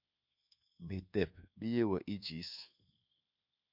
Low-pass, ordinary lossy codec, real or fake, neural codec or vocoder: 5.4 kHz; MP3, 48 kbps; fake; codec, 24 kHz, 1.2 kbps, DualCodec